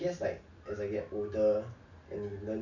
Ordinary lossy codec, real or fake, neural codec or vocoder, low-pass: none; real; none; 7.2 kHz